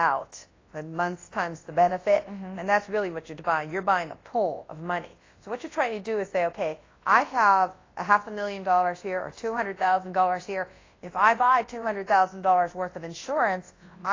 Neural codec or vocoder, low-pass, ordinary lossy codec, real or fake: codec, 24 kHz, 0.9 kbps, WavTokenizer, large speech release; 7.2 kHz; AAC, 32 kbps; fake